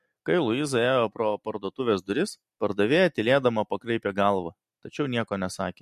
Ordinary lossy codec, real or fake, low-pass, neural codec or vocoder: MP3, 64 kbps; real; 14.4 kHz; none